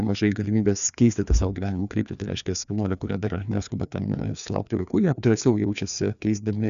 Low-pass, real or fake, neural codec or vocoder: 7.2 kHz; fake; codec, 16 kHz, 2 kbps, FreqCodec, larger model